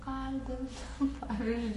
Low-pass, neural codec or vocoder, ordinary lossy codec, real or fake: 14.4 kHz; codec, 44.1 kHz, 7.8 kbps, Pupu-Codec; MP3, 48 kbps; fake